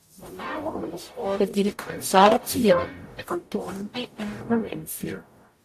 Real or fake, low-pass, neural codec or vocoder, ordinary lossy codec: fake; 14.4 kHz; codec, 44.1 kHz, 0.9 kbps, DAC; AAC, 64 kbps